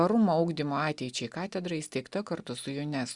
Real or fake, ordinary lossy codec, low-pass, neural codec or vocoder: real; Opus, 64 kbps; 10.8 kHz; none